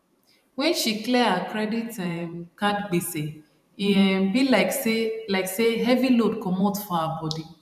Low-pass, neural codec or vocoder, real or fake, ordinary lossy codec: 14.4 kHz; vocoder, 44.1 kHz, 128 mel bands every 512 samples, BigVGAN v2; fake; none